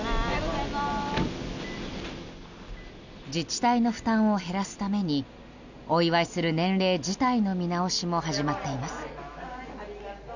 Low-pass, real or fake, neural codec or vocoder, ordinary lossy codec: 7.2 kHz; real; none; none